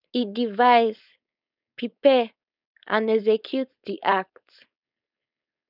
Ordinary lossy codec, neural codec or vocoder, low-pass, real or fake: none; codec, 16 kHz, 4.8 kbps, FACodec; 5.4 kHz; fake